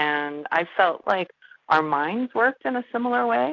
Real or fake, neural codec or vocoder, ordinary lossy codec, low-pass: real; none; AAC, 48 kbps; 7.2 kHz